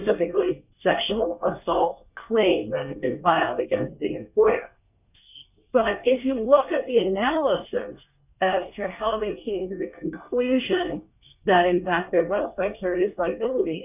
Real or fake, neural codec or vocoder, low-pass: fake; codec, 24 kHz, 1 kbps, SNAC; 3.6 kHz